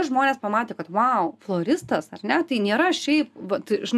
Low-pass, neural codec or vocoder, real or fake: 14.4 kHz; none; real